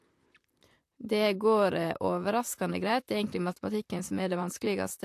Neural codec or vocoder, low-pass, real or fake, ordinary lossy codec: vocoder, 44.1 kHz, 128 mel bands, Pupu-Vocoder; 14.4 kHz; fake; AAC, 64 kbps